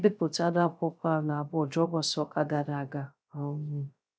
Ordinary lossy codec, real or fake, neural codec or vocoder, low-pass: none; fake; codec, 16 kHz, 0.3 kbps, FocalCodec; none